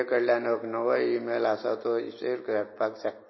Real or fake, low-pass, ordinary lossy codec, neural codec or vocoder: real; 7.2 kHz; MP3, 24 kbps; none